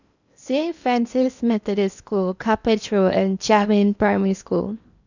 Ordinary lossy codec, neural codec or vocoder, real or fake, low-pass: none; codec, 16 kHz in and 24 kHz out, 0.8 kbps, FocalCodec, streaming, 65536 codes; fake; 7.2 kHz